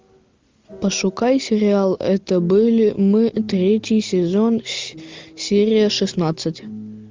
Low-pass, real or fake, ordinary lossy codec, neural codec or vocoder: 7.2 kHz; real; Opus, 32 kbps; none